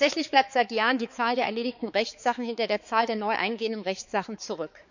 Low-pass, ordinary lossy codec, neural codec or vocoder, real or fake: 7.2 kHz; none; codec, 16 kHz, 4 kbps, X-Codec, HuBERT features, trained on balanced general audio; fake